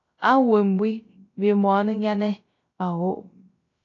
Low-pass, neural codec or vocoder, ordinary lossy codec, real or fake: 7.2 kHz; codec, 16 kHz, 0.3 kbps, FocalCodec; AAC, 32 kbps; fake